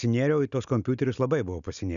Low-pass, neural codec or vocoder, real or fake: 7.2 kHz; none; real